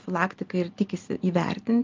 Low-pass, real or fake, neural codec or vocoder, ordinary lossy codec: 7.2 kHz; real; none; Opus, 32 kbps